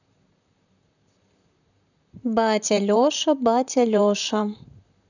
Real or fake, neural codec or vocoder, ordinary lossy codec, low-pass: fake; vocoder, 44.1 kHz, 128 mel bands, Pupu-Vocoder; none; 7.2 kHz